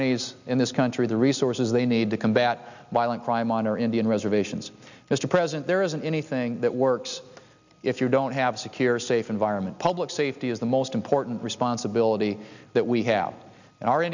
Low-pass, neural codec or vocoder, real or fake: 7.2 kHz; none; real